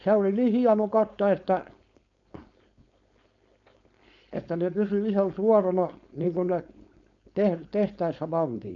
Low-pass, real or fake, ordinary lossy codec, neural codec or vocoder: 7.2 kHz; fake; none; codec, 16 kHz, 4.8 kbps, FACodec